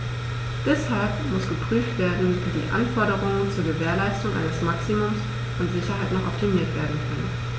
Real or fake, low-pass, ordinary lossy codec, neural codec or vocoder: real; none; none; none